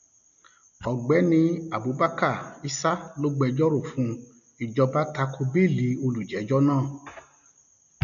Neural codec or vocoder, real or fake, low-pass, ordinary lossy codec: none; real; 7.2 kHz; none